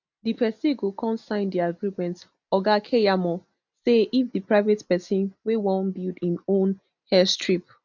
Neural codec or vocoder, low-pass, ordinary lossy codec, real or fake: none; 7.2 kHz; none; real